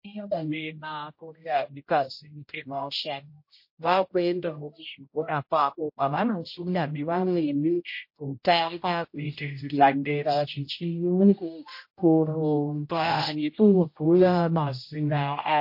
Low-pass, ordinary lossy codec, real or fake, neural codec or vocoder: 5.4 kHz; MP3, 32 kbps; fake; codec, 16 kHz, 0.5 kbps, X-Codec, HuBERT features, trained on general audio